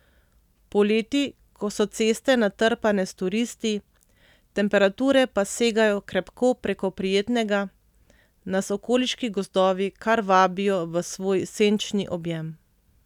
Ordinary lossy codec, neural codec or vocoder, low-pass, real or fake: none; none; 19.8 kHz; real